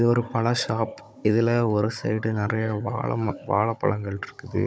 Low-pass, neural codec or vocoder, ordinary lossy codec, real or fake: none; codec, 16 kHz, 16 kbps, FunCodec, trained on Chinese and English, 50 frames a second; none; fake